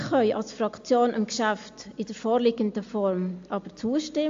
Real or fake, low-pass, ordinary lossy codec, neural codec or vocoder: real; 7.2 kHz; AAC, 48 kbps; none